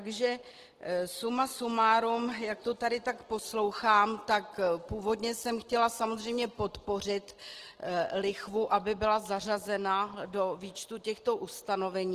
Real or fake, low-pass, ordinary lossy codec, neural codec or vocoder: real; 14.4 kHz; Opus, 16 kbps; none